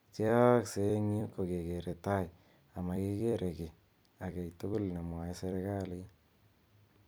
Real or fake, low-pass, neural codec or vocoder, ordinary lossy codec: fake; none; vocoder, 44.1 kHz, 128 mel bands every 256 samples, BigVGAN v2; none